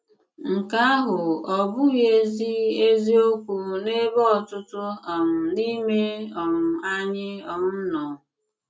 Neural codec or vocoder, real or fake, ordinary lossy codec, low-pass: none; real; none; none